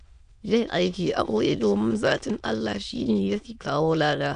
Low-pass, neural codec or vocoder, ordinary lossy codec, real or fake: 9.9 kHz; autoencoder, 22.05 kHz, a latent of 192 numbers a frame, VITS, trained on many speakers; none; fake